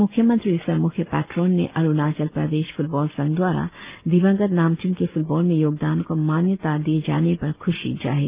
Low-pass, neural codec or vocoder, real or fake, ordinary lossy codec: 3.6 kHz; none; real; Opus, 24 kbps